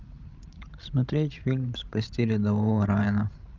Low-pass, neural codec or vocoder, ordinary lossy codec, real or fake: 7.2 kHz; codec, 16 kHz, 16 kbps, FreqCodec, larger model; Opus, 24 kbps; fake